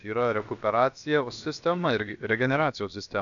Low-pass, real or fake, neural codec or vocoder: 7.2 kHz; fake; codec, 16 kHz, about 1 kbps, DyCAST, with the encoder's durations